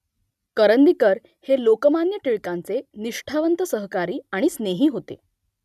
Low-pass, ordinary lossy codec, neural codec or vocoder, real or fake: 14.4 kHz; none; none; real